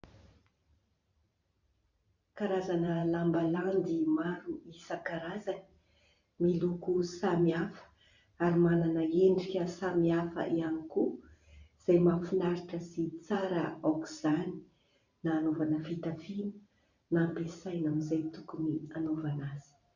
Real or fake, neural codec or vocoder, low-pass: fake; vocoder, 44.1 kHz, 128 mel bands every 512 samples, BigVGAN v2; 7.2 kHz